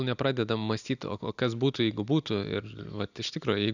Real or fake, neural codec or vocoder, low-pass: real; none; 7.2 kHz